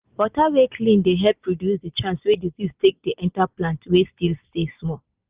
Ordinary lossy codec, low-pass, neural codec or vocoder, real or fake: Opus, 24 kbps; 3.6 kHz; none; real